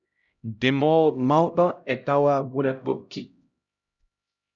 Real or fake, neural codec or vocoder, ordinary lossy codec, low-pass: fake; codec, 16 kHz, 0.5 kbps, X-Codec, HuBERT features, trained on LibriSpeech; Opus, 64 kbps; 7.2 kHz